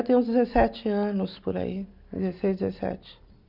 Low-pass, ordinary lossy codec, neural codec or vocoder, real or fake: 5.4 kHz; none; none; real